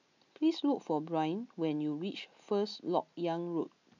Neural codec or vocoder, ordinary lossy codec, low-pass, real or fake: none; none; 7.2 kHz; real